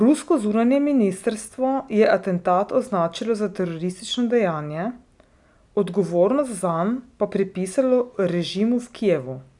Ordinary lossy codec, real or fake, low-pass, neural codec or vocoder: none; real; 10.8 kHz; none